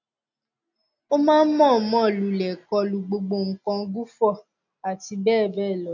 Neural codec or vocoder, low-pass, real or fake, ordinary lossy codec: none; 7.2 kHz; real; none